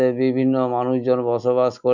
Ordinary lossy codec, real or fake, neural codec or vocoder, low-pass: none; real; none; 7.2 kHz